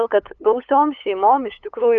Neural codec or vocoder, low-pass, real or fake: codec, 16 kHz, 8 kbps, FunCodec, trained on LibriTTS, 25 frames a second; 7.2 kHz; fake